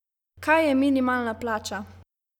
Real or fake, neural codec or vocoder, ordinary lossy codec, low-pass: real; none; none; 19.8 kHz